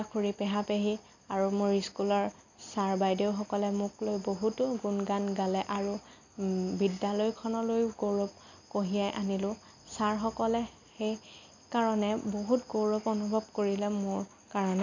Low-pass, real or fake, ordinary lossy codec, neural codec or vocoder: 7.2 kHz; real; none; none